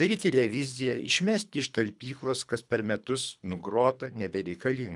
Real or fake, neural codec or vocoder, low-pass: fake; codec, 24 kHz, 3 kbps, HILCodec; 10.8 kHz